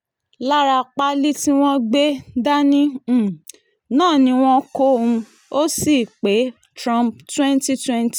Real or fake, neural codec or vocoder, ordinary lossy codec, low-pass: real; none; none; none